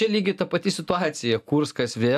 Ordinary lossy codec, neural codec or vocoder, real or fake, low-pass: AAC, 96 kbps; none; real; 14.4 kHz